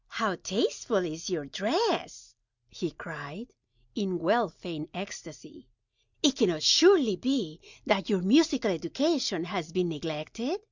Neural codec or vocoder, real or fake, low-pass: none; real; 7.2 kHz